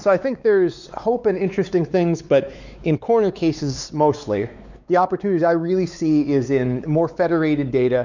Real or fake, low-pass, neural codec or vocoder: fake; 7.2 kHz; codec, 16 kHz, 4 kbps, X-Codec, WavLM features, trained on Multilingual LibriSpeech